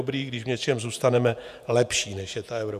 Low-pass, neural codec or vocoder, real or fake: 14.4 kHz; vocoder, 48 kHz, 128 mel bands, Vocos; fake